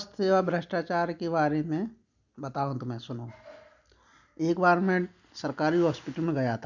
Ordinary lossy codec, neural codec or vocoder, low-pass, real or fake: none; none; 7.2 kHz; real